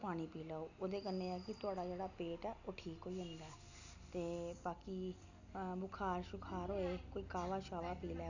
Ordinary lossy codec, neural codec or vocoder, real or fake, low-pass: none; none; real; 7.2 kHz